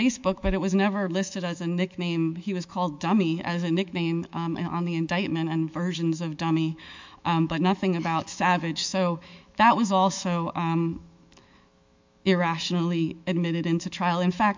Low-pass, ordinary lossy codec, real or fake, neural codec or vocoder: 7.2 kHz; MP3, 64 kbps; fake; autoencoder, 48 kHz, 128 numbers a frame, DAC-VAE, trained on Japanese speech